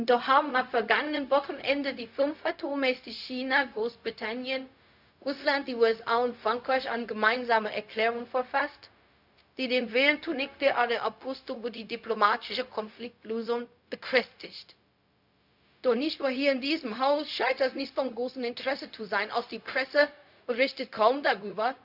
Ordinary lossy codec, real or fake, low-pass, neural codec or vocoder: none; fake; 5.4 kHz; codec, 16 kHz, 0.4 kbps, LongCat-Audio-Codec